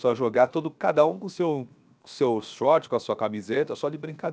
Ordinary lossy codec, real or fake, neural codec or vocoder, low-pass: none; fake; codec, 16 kHz, 0.7 kbps, FocalCodec; none